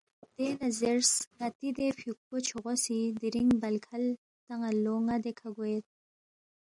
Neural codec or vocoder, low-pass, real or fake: none; 10.8 kHz; real